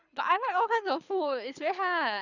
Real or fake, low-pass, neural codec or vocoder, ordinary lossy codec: fake; 7.2 kHz; codec, 24 kHz, 6 kbps, HILCodec; none